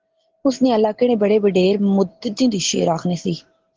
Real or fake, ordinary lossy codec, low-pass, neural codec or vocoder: real; Opus, 16 kbps; 7.2 kHz; none